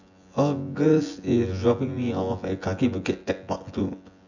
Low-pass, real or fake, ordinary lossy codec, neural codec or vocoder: 7.2 kHz; fake; none; vocoder, 24 kHz, 100 mel bands, Vocos